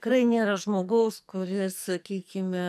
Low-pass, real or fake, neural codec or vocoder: 14.4 kHz; fake; codec, 44.1 kHz, 2.6 kbps, SNAC